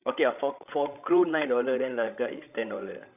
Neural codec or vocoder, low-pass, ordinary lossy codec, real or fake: codec, 16 kHz, 16 kbps, FreqCodec, larger model; 3.6 kHz; none; fake